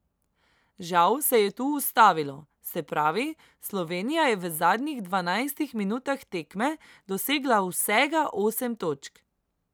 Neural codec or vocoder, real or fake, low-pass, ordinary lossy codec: none; real; none; none